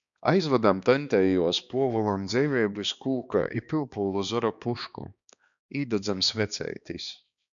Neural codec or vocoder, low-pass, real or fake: codec, 16 kHz, 2 kbps, X-Codec, HuBERT features, trained on balanced general audio; 7.2 kHz; fake